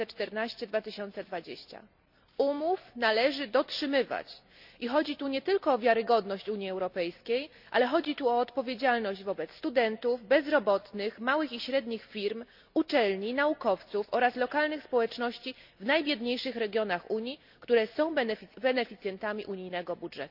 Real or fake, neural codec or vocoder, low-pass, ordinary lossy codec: real; none; 5.4 kHz; none